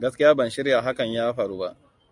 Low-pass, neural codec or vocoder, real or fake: 10.8 kHz; none; real